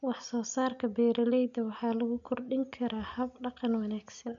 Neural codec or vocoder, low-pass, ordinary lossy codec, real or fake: none; 7.2 kHz; none; real